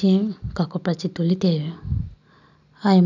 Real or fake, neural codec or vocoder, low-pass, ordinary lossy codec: real; none; 7.2 kHz; none